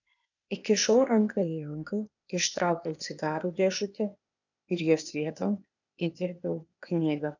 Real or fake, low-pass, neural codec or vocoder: fake; 7.2 kHz; codec, 16 kHz, 0.8 kbps, ZipCodec